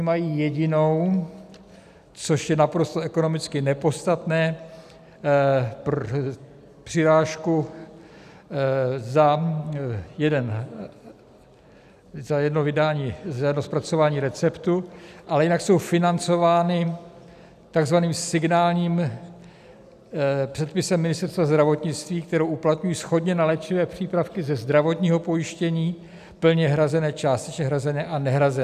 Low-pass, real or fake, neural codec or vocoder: 14.4 kHz; real; none